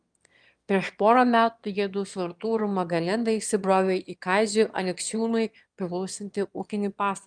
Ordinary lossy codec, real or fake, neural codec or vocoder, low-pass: Opus, 32 kbps; fake; autoencoder, 22.05 kHz, a latent of 192 numbers a frame, VITS, trained on one speaker; 9.9 kHz